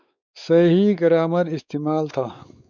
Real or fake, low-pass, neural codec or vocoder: fake; 7.2 kHz; codec, 16 kHz, 4 kbps, X-Codec, WavLM features, trained on Multilingual LibriSpeech